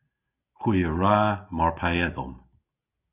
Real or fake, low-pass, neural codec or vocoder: real; 3.6 kHz; none